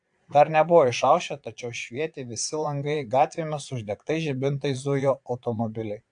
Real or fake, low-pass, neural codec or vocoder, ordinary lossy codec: fake; 9.9 kHz; vocoder, 22.05 kHz, 80 mel bands, Vocos; AAC, 64 kbps